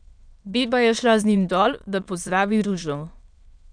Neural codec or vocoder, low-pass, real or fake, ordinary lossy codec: autoencoder, 22.05 kHz, a latent of 192 numbers a frame, VITS, trained on many speakers; 9.9 kHz; fake; none